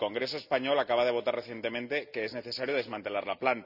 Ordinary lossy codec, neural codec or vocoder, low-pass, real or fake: none; none; 5.4 kHz; real